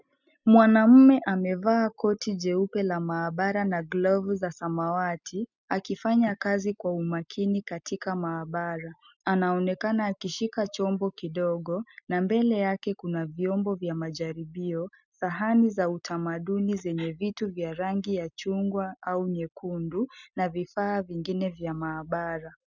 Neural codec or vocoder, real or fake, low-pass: none; real; 7.2 kHz